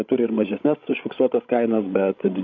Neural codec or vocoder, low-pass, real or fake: codec, 16 kHz, 16 kbps, FreqCodec, larger model; 7.2 kHz; fake